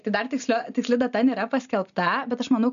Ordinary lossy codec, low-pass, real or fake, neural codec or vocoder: MP3, 96 kbps; 7.2 kHz; real; none